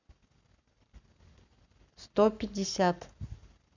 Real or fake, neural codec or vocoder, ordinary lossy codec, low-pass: fake; codec, 44.1 kHz, 7.8 kbps, Pupu-Codec; none; 7.2 kHz